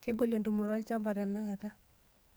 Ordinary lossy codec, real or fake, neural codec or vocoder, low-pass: none; fake; codec, 44.1 kHz, 2.6 kbps, SNAC; none